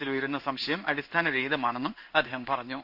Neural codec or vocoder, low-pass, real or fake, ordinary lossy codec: codec, 16 kHz in and 24 kHz out, 1 kbps, XY-Tokenizer; 5.4 kHz; fake; none